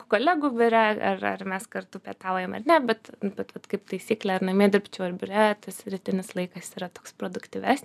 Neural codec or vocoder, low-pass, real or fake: none; 14.4 kHz; real